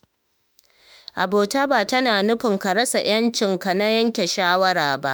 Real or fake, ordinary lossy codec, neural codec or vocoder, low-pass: fake; none; autoencoder, 48 kHz, 32 numbers a frame, DAC-VAE, trained on Japanese speech; none